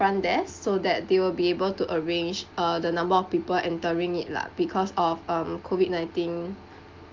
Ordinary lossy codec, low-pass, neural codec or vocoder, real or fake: Opus, 24 kbps; 7.2 kHz; none; real